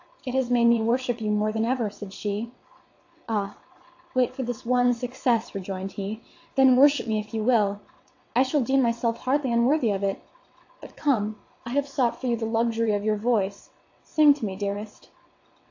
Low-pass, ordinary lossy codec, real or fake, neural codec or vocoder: 7.2 kHz; AAC, 48 kbps; fake; vocoder, 22.05 kHz, 80 mel bands, WaveNeXt